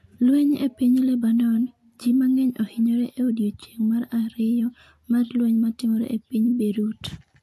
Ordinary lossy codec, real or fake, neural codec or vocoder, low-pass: AAC, 64 kbps; real; none; 14.4 kHz